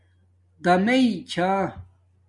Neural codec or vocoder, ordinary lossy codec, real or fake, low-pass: none; MP3, 96 kbps; real; 10.8 kHz